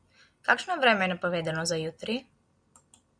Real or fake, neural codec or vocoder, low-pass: real; none; 9.9 kHz